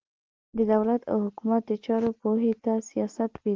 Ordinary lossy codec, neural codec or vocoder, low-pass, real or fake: Opus, 16 kbps; none; 7.2 kHz; real